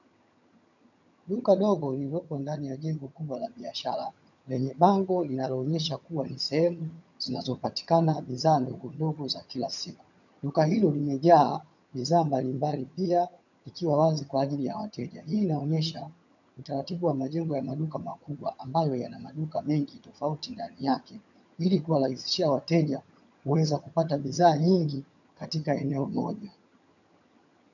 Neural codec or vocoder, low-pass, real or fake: vocoder, 22.05 kHz, 80 mel bands, HiFi-GAN; 7.2 kHz; fake